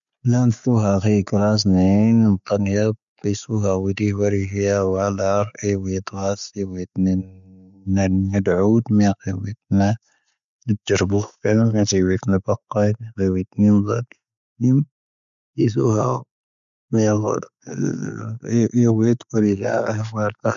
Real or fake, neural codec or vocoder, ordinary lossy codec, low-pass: real; none; none; 7.2 kHz